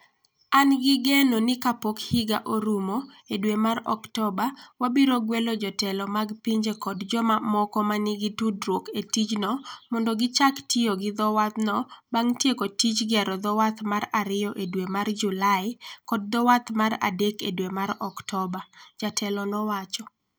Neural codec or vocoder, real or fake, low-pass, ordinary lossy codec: none; real; none; none